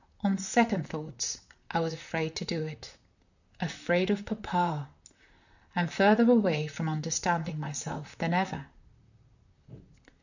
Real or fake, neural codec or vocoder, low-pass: fake; vocoder, 44.1 kHz, 128 mel bands, Pupu-Vocoder; 7.2 kHz